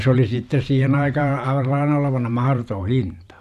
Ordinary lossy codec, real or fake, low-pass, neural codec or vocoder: none; fake; 14.4 kHz; vocoder, 44.1 kHz, 128 mel bands every 512 samples, BigVGAN v2